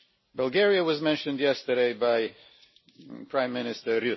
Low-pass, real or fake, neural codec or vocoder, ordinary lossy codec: 7.2 kHz; real; none; MP3, 24 kbps